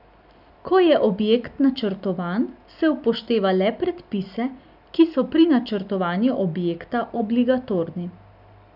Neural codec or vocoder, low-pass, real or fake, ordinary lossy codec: none; 5.4 kHz; real; none